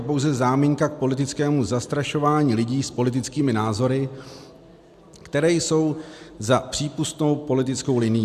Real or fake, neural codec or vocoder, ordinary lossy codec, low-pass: real; none; AAC, 96 kbps; 14.4 kHz